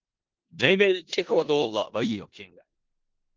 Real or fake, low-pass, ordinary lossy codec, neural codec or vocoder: fake; 7.2 kHz; Opus, 16 kbps; codec, 16 kHz in and 24 kHz out, 0.4 kbps, LongCat-Audio-Codec, four codebook decoder